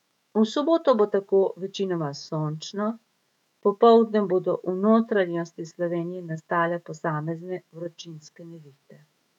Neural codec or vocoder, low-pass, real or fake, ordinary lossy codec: autoencoder, 48 kHz, 128 numbers a frame, DAC-VAE, trained on Japanese speech; 19.8 kHz; fake; none